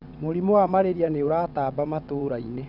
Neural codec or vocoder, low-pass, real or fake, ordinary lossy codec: vocoder, 22.05 kHz, 80 mel bands, WaveNeXt; 5.4 kHz; fake; none